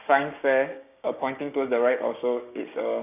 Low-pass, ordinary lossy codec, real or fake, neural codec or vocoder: 3.6 kHz; none; fake; codec, 16 kHz, 6 kbps, DAC